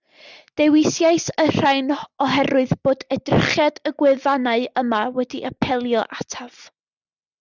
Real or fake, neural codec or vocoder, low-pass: real; none; 7.2 kHz